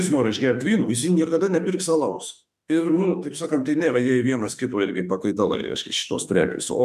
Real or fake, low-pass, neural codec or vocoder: fake; 14.4 kHz; autoencoder, 48 kHz, 32 numbers a frame, DAC-VAE, trained on Japanese speech